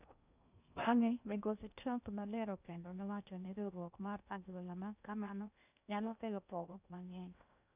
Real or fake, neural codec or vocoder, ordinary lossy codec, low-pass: fake; codec, 16 kHz in and 24 kHz out, 0.6 kbps, FocalCodec, streaming, 2048 codes; none; 3.6 kHz